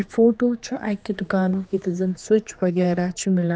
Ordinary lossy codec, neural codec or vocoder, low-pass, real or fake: none; codec, 16 kHz, 2 kbps, X-Codec, HuBERT features, trained on general audio; none; fake